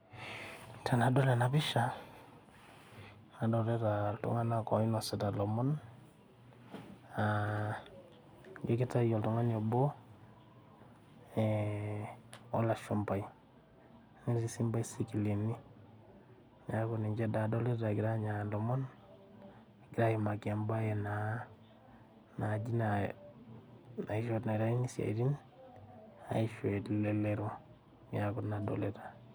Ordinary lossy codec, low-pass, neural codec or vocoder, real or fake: none; none; none; real